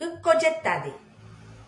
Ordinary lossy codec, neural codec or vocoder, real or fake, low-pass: MP3, 64 kbps; none; real; 10.8 kHz